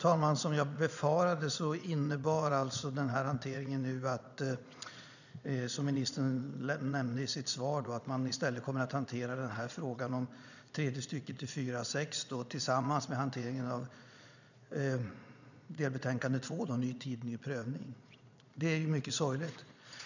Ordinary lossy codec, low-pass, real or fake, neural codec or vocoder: none; 7.2 kHz; fake; vocoder, 22.05 kHz, 80 mel bands, Vocos